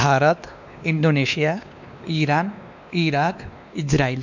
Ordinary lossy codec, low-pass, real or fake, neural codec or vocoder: none; 7.2 kHz; fake; codec, 16 kHz, 2 kbps, X-Codec, WavLM features, trained on Multilingual LibriSpeech